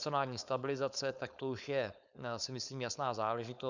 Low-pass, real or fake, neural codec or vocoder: 7.2 kHz; fake; codec, 16 kHz, 4.8 kbps, FACodec